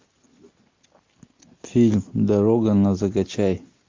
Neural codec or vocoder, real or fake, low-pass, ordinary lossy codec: none; real; 7.2 kHz; MP3, 48 kbps